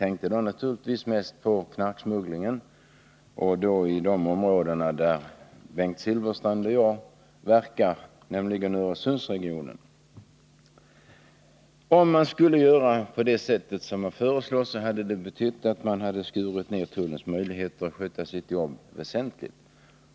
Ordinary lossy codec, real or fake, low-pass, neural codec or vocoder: none; real; none; none